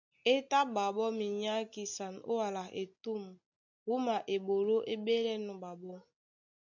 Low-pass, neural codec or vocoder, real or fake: 7.2 kHz; none; real